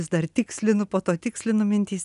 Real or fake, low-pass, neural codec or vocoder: real; 10.8 kHz; none